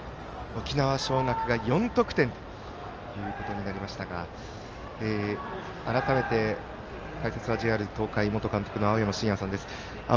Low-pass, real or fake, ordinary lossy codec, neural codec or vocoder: 7.2 kHz; real; Opus, 24 kbps; none